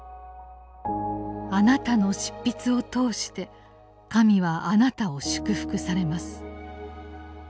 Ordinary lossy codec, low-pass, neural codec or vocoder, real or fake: none; none; none; real